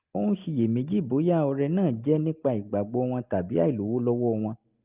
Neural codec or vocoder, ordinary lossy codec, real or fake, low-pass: none; Opus, 32 kbps; real; 3.6 kHz